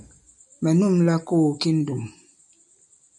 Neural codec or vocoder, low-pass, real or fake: none; 10.8 kHz; real